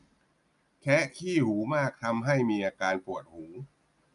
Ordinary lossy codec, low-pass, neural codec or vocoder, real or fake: none; 10.8 kHz; none; real